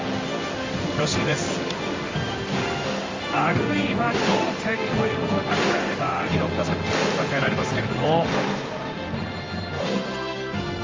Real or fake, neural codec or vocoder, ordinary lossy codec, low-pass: fake; codec, 16 kHz in and 24 kHz out, 1 kbps, XY-Tokenizer; Opus, 32 kbps; 7.2 kHz